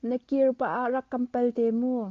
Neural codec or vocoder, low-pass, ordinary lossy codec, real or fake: none; 7.2 kHz; Opus, 24 kbps; real